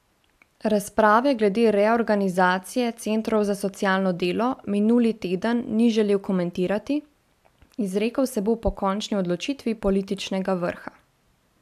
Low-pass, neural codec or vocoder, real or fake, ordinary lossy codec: 14.4 kHz; none; real; AAC, 96 kbps